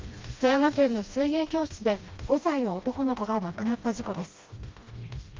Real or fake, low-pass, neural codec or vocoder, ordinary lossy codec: fake; 7.2 kHz; codec, 16 kHz, 1 kbps, FreqCodec, smaller model; Opus, 32 kbps